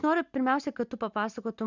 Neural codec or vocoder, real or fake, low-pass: none; real; 7.2 kHz